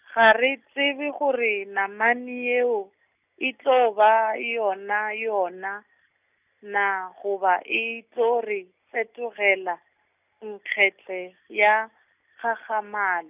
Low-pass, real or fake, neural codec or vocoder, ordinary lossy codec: 3.6 kHz; real; none; none